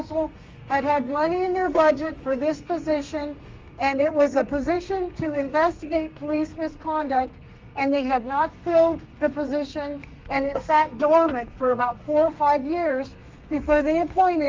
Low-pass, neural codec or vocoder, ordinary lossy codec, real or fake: 7.2 kHz; codec, 32 kHz, 1.9 kbps, SNAC; Opus, 32 kbps; fake